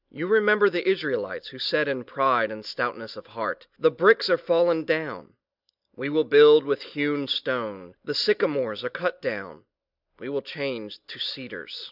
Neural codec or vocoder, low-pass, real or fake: none; 5.4 kHz; real